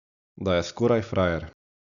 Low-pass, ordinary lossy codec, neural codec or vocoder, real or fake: 7.2 kHz; none; none; real